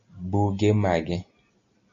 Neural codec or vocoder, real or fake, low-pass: none; real; 7.2 kHz